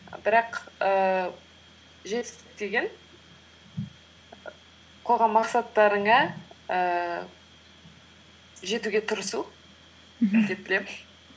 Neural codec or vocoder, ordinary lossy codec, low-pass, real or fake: none; none; none; real